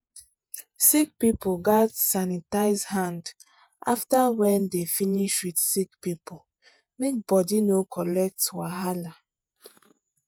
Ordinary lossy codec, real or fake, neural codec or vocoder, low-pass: none; fake; vocoder, 48 kHz, 128 mel bands, Vocos; none